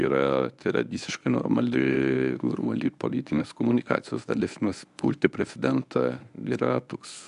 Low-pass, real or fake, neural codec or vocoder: 10.8 kHz; fake; codec, 24 kHz, 0.9 kbps, WavTokenizer, medium speech release version 1